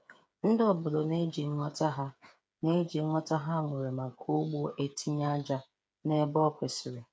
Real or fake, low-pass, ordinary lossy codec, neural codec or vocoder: fake; none; none; codec, 16 kHz, 8 kbps, FreqCodec, smaller model